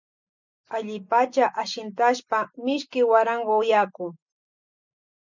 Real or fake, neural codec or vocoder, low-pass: real; none; 7.2 kHz